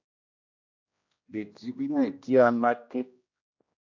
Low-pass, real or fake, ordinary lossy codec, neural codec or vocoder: 7.2 kHz; fake; AAC, 48 kbps; codec, 16 kHz, 1 kbps, X-Codec, HuBERT features, trained on general audio